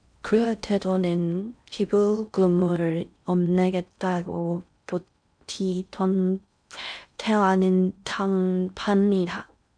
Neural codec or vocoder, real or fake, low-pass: codec, 16 kHz in and 24 kHz out, 0.6 kbps, FocalCodec, streaming, 4096 codes; fake; 9.9 kHz